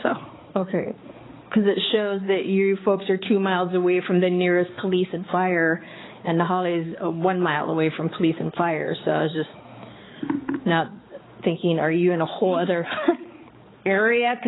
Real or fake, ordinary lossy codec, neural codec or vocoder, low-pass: fake; AAC, 16 kbps; codec, 16 kHz, 4 kbps, X-Codec, HuBERT features, trained on balanced general audio; 7.2 kHz